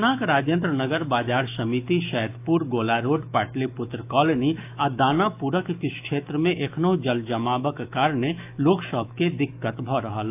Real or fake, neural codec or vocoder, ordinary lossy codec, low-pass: fake; autoencoder, 48 kHz, 128 numbers a frame, DAC-VAE, trained on Japanese speech; AAC, 32 kbps; 3.6 kHz